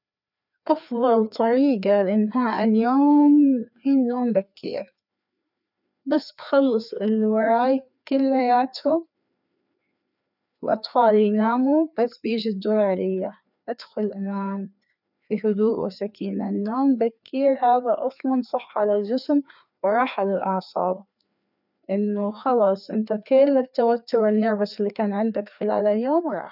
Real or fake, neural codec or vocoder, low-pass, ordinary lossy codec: fake; codec, 16 kHz, 2 kbps, FreqCodec, larger model; 5.4 kHz; none